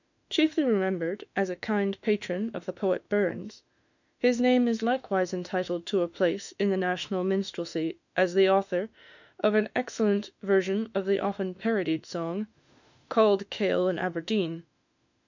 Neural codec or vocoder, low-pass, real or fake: autoencoder, 48 kHz, 32 numbers a frame, DAC-VAE, trained on Japanese speech; 7.2 kHz; fake